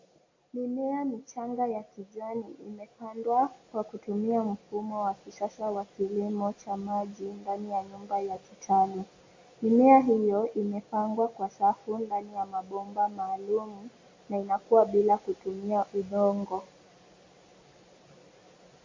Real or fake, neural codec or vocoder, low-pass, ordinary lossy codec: real; none; 7.2 kHz; MP3, 32 kbps